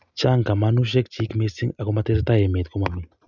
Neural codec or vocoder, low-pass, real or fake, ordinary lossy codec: none; 7.2 kHz; real; none